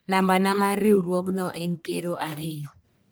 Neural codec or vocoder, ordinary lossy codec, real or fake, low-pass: codec, 44.1 kHz, 1.7 kbps, Pupu-Codec; none; fake; none